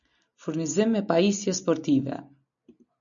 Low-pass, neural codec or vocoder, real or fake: 7.2 kHz; none; real